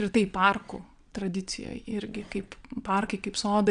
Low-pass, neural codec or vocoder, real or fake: 9.9 kHz; vocoder, 22.05 kHz, 80 mel bands, Vocos; fake